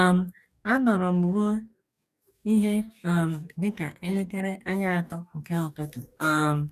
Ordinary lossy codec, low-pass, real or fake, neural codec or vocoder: none; 14.4 kHz; fake; codec, 44.1 kHz, 2.6 kbps, DAC